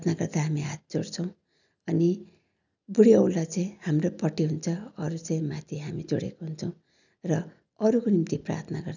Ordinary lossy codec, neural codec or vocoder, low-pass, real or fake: none; none; 7.2 kHz; real